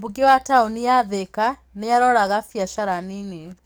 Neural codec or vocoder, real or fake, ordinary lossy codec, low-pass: codec, 44.1 kHz, 7.8 kbps, DAC; fake; none; none